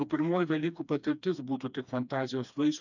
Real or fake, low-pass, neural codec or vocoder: fake; 7.2 kHz; codec, 16 kHz, 2 kbps, FreqCodec, smaller model